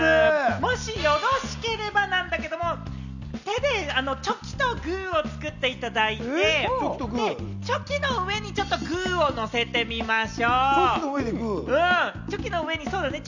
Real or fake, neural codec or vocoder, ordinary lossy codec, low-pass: real; none; none; 7.2 kHz